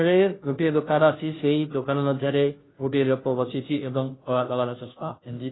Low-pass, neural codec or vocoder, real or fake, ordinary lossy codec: 7.2 kHz; codec, 16 kHz, 0.5 kbps, FunCodec, trained on Chinese and English, 25 frames a second; fake; AAC, 16 kbps